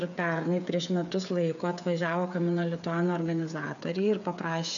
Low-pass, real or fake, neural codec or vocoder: 7.2 kHz; fake; codec, 16 kHz, 8 kbps, FreqCodec, smaller model